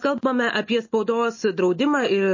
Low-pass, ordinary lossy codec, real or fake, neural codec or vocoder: 7.2 kHz; MP3, 32 kbps; real; none